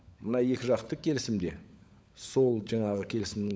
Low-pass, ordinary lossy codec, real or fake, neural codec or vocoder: none; none; fake; codec, 16 kHz, 16 kbps, FunCodec, trained on LibriTTS, 50 frames a second